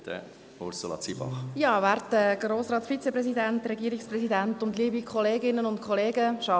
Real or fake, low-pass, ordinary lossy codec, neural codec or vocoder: real; none; none; none